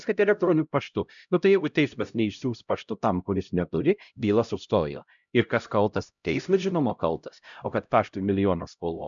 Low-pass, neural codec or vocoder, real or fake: 7.2 kHz; codec, 16 kHz, 0.5 kbps, X-Codec, HuBERT features, trained on LibriSpeech; fake